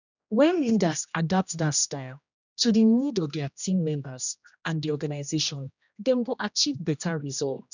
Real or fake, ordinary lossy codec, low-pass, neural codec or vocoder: fake; none; 7.2 kHz; codec, 16 kHz, 1 kbps, X-Codec, HuBERT features, trained on general audio